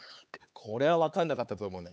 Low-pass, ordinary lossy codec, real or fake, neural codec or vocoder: none; none; fake; codec, 16 kHz, 4 kbps, X-Codec, HuBERT features, trained on LibriSpeech